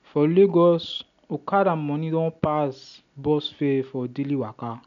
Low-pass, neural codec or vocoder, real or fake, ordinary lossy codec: 7.2 kHz; none; real; none